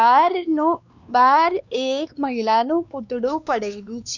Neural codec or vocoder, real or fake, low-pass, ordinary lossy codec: codec, 16 kHz, 2 kbps, X-Codec, WavLM features, trained on Multilingual LibriSpeech; fake; 7.2 kHz; none